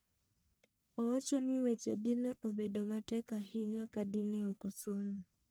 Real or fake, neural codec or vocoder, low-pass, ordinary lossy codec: fake; codec, 44.1 kHz, 1.7 kbps, Pupu-Codec; none; none